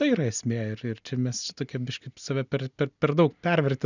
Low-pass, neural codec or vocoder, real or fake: 7.2 kHz; none; real